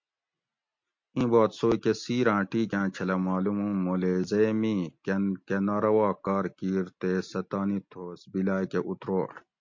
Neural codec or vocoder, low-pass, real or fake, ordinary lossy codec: none; 7.2 kHz; real; MP3, 48 kbps